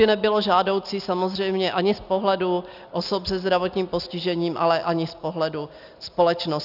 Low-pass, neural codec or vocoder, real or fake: 5.4 kHz; none; real